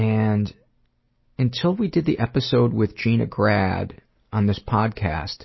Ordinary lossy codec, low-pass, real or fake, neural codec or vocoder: MP3, 24 kbps; 7.2 kHz; real; none